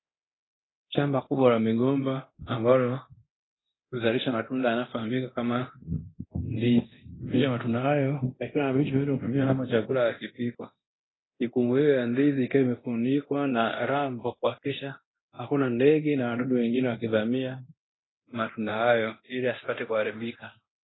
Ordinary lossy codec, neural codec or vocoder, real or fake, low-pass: AAC, 16 kbps; codec, 24 kHz, 0.9 kbps, DualCodec; fake; 7.2 kHz